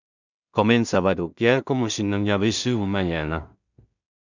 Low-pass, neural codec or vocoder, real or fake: 7.2 kHz; codec, 16 kHz in and 24 kHz out, 0.4 kbps, LongCat-Audio-Codec, two codebook decoder; fake